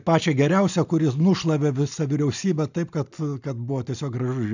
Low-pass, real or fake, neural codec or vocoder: 7.2 kHz; real; none